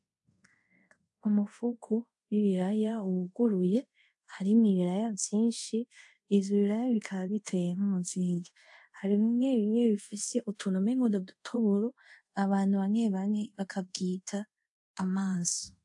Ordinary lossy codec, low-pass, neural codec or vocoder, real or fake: AAC, 64 kbps; 10.8 kHz; codec, 24 kHz, 0.5 kbps, DualCodec; fake